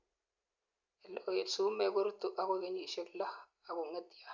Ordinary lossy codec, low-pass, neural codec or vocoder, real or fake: Opus, 64 kbps; 7.2 kHz; none; real